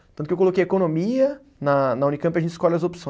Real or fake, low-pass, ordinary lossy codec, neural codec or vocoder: real; none; none; none